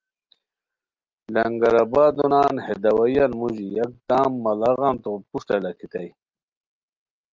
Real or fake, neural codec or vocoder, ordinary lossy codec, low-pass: real; none; Opus, 24 kbps; 7.2 kHz